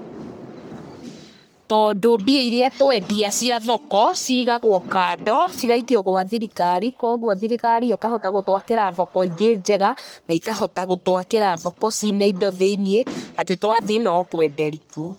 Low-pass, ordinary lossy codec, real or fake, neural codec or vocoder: none; none; fake; codec, 44.1 kHz, 1.7 kbps, Pupu-Codec